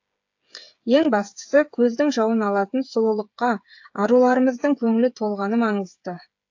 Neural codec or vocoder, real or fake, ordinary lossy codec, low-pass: codec, 16 kHz, 8 kbps, FreqCodec, smaller model; fake; AAC, 48 kbps; 7.2 kHz